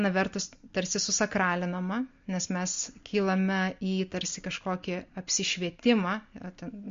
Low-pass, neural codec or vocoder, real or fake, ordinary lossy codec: 7.2 kHz; none; real; MP3, 48 kbps